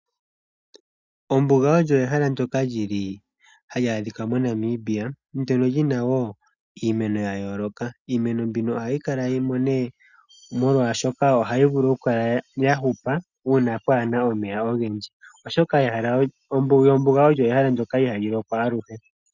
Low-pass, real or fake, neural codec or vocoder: 7.2 kHz; real; none